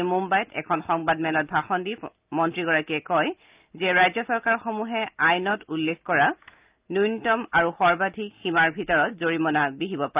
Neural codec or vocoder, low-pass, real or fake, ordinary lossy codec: none; 3.6 kHz; real; Opus, 24 kbps